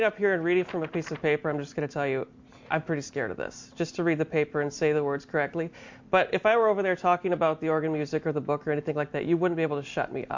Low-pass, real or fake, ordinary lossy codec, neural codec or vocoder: 7.2 kHz; real; MP3, 48 kbps; none